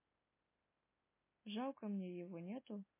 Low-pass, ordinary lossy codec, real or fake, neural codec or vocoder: 3.6 kHz; MP3, 16 kbps; real; none